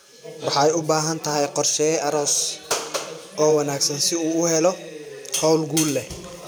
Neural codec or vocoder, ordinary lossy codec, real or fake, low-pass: vocoder, 44.1 kHz, 128 mel bands every 512 samples, BigVGAN v2; none; fake; none